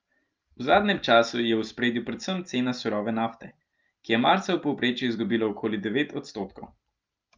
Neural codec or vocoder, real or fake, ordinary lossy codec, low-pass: none; real; Opus, 24 kbps; 7.2 kHz